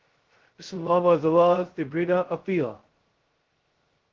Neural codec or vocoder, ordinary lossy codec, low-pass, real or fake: codec, 16 kHz, 0.2 kbps, FocalCodec; Opus, 16 kbps; 7.2 kHz; fake